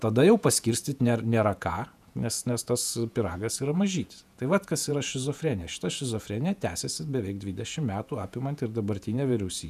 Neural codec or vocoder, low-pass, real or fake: vocoder, 48 kHz, 128 mel bands, Vocos; 14.4 kHz; fake